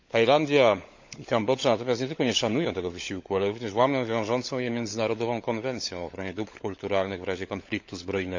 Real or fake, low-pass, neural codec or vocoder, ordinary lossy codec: fake; 7.2 kHz; codec, 16 kHz, 16 kbps, FunCodec, trained on LibriTTS, 50 frames a second; MP3, 64 kbps